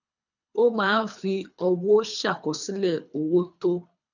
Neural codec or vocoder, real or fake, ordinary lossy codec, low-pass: codec, 24 kHz, 3 kbps, HILCodec; fake; none; 7.2 kHz